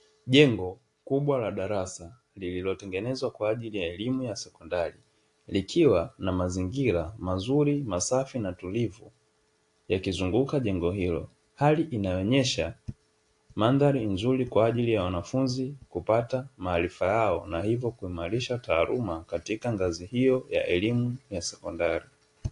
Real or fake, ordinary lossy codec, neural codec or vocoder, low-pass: real; AAC, 48 kbps; none; 10.8 kHz